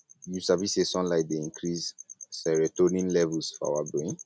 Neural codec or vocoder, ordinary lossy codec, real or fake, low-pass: none; none; real; none